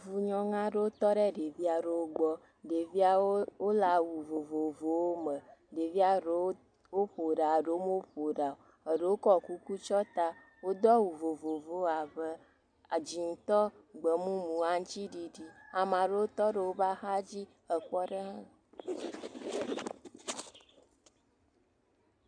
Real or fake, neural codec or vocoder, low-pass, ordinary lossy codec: real; none; 9.9 kHz; MP3, 96 kbps